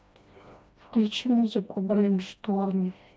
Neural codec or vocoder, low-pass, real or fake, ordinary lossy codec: codec, 16 kHz, 1 kbps, FreqCodec, smaller model; none; fake; none